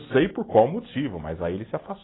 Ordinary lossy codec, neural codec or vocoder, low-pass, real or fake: AAC, 16 kbps; none; 7.2 kHz; real